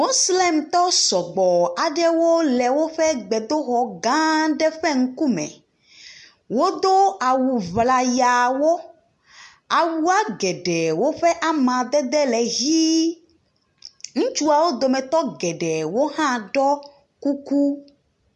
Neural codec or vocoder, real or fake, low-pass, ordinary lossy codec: none; real; 10.8 kHz; MP3, 64 kbps